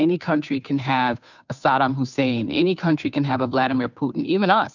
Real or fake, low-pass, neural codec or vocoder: fake; 7.2 kHz; codec, 16 kHz, 2 kbps, FunCodec, trained on Chinese and English, 25 frames a second